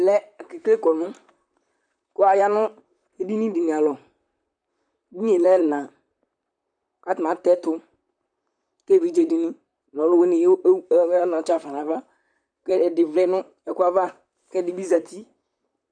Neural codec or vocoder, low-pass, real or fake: vocoder, 44.1 kHz, 128 mel bands, Pupu-Vocoder; 9.9 kHz; fake